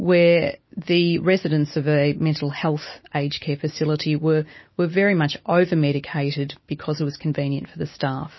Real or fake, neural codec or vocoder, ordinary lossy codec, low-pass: real; none; MP3, 24 kbps; 7.2 kHz